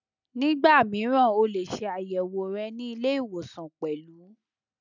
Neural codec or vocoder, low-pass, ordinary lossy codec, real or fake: none; 7.2 kHz; none; real